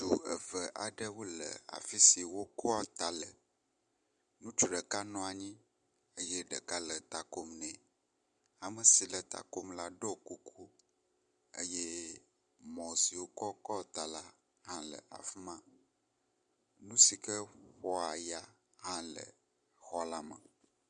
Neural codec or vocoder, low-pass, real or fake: none; 9.9 kHz; real